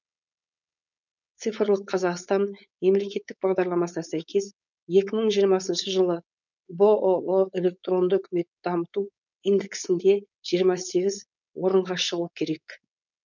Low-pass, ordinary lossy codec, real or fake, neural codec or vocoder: 7.2 kHz; none; fake; codec, 16 kHz, 4.8 kbps, FACodec